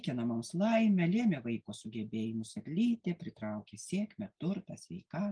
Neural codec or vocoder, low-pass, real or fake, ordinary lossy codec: none; 9.9 kHz; real; Opus, 32 kbps